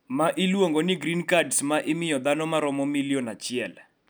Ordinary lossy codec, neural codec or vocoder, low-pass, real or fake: none; none; none; real